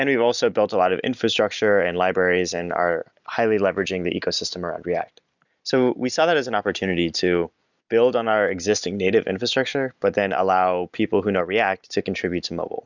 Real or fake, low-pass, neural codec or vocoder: real; 7.2 kHz; none